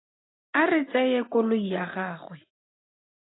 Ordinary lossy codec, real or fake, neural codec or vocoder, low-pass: AAC, 16 kbps; real; none; 7.2 kHz